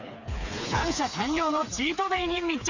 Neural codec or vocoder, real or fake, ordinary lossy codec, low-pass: codec, 16 kHz, 4 kbps, FreqCodec, smaller model; fake; Opus, 64 kbps; 7.2 kHz